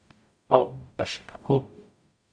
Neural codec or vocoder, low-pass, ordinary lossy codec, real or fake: codec, 44.1 kHz, 0.9 kbps, DAC; 9.9 kHz; AAC, 64 kbps; fake